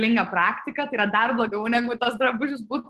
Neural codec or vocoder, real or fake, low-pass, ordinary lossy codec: none; real; 14.4 kHz; Opus, 16 kbps